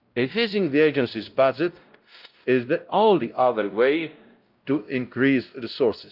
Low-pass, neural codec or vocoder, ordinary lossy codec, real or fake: 5.4 kHz; codec, 16 kHz, 0.5 kbps, X-Codec, WavLM features, trained on Multilingual LibriSpeech; Opus, 24 kbps; fake